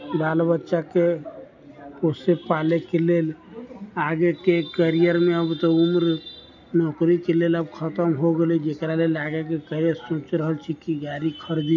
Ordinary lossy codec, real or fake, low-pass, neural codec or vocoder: none; real; 7.2 kHz; none